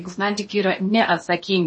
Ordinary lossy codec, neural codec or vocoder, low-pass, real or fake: MP3, 32 kbps; codec, 16 kHz in and 24 kHz out, 0.8 kbps, FocalCodec, streaming, 65536 codes; 9.9 kHz; fake